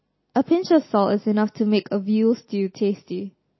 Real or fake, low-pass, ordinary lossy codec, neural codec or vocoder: real; 7.2 kHz; MP3, 24 kbps; none